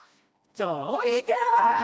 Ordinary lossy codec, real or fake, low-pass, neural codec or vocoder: none; fake; none; codec, 16 kHz, 1 kbps, FreqCodec, smaller model